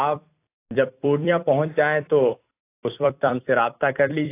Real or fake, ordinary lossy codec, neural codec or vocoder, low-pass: fake; AAC, 24 kbps; vocoder, 44.1 kHz, 128 mel bands every 256 samples, BigVGAN v2; 3.6 kHz